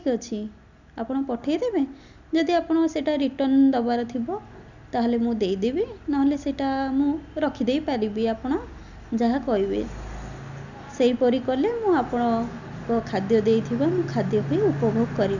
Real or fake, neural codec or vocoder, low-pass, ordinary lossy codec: real; none; 7.2 kHz; none